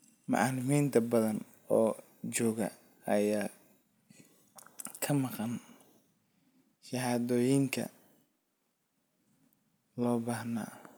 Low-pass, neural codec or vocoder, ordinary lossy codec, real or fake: none; none; none; real